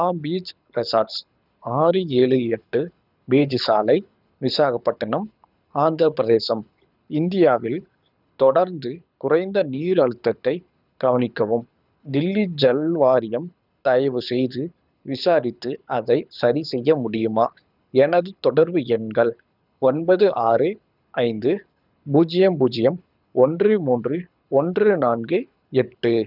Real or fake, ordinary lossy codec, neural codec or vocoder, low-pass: fake; none; codec, 24 kHz, 6 kbps, HILCodec; 5.4 kHz